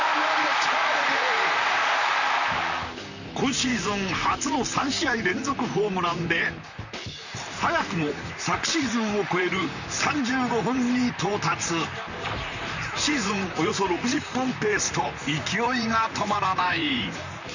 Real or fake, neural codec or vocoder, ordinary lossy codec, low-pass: fake; vocoder, 44.1 kHz, 128 mel bands, Pupu-Vocoder; none; 7.2 kHz